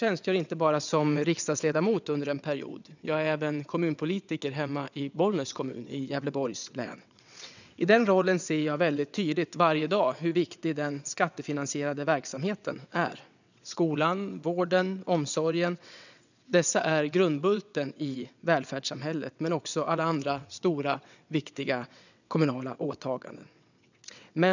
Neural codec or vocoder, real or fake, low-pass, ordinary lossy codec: vocoder, 22.05 kHz, 80 mel bands, WaveNeXt; fake; 7.2 kHz; none